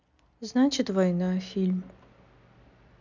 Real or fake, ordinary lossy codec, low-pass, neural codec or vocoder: real; none; 7.2 kHz; none